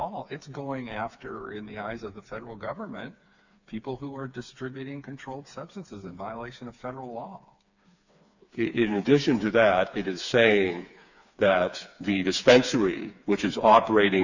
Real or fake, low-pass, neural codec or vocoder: fake; 7.2 kHz; codec, 16 kHz, 4 kbps, FreqCodec, smaller model